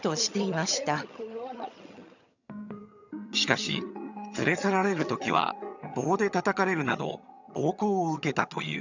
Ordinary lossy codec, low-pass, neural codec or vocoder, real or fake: none; 7.2 kHz; vocoder, 22.05 kHz, 80 mel bands, HiFi-GAN; fake